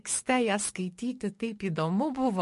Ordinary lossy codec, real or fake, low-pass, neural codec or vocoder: MP3, 48 kbps; fake; 14.4 kHz; codec, 44.1 kHz, 7.8 kbps, DAC